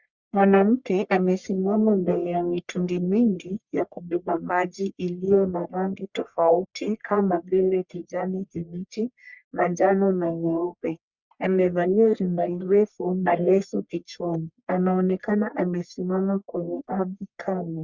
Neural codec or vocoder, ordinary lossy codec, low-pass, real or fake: codec, 44.1 kHz, 1.7 kbps, Pupu-Codec; Opus, 64 kbps; 7.2 kHz; fake